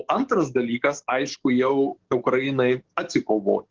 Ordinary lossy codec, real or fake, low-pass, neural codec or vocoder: Opus, 16 kbps; fake; 7.2 kHz; codec, 44.1 kHz, 7.8 kbps, DAC